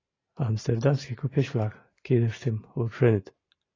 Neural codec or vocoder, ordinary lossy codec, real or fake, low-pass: none; AAC, 32 kbps; real; 7.2 kHz